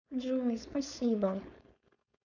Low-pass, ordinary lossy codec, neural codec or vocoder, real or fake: 7.2 kHz; none; codec, 16 kHz, 4.8 kbps, FACodec; fake